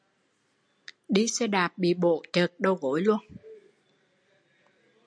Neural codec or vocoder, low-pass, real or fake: none; 9.9 kHz; real